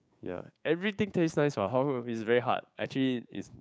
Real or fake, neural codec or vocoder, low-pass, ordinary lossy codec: fake; codec, 16 kHz, 6 kbps, DAC; none; none